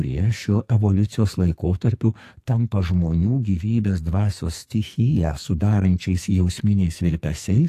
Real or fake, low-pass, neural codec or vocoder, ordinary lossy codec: fake; 14.4 kHz; codec, 44.1 kHz, 2.6 kbps, SNAC; AAC, 64 kbps